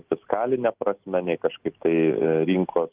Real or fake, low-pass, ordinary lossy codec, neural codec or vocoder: real; 3.6 kHz; Opus, 24 kbps; none